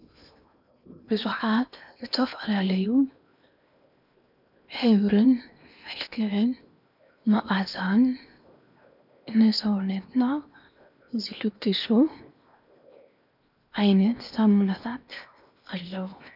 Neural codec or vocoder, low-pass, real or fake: codec, 16 kHz in and 24 kHz out, 0.8 kbps, FocalCodec, streaming, 65536 codes; 5.4 kHz; fake